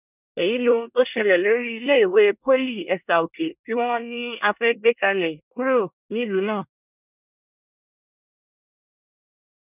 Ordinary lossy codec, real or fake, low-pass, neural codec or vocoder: none; fake; 3.6 kHz; codec, 24 kHz, 1 kbps, SNAC